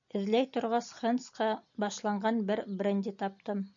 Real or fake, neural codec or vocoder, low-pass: real; none; 7.2 kHz